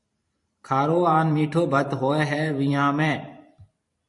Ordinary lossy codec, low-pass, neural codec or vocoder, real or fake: MP3, 48 kbps; 10.8 kHz; vocoder, 44.1 kHz, 128 mel bands every 512 samples, BigVGAN v2; fake